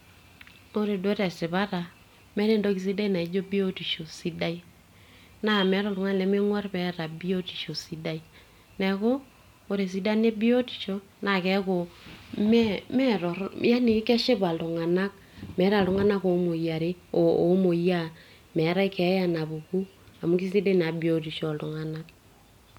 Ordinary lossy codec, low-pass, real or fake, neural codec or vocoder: MP3, 96 kbps; 19.8 kHz; real; none